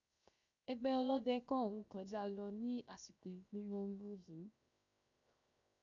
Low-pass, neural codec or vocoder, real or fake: 7.2 kHz; codec, 16 kHz, 0.7 kbps, FocalCodec; fake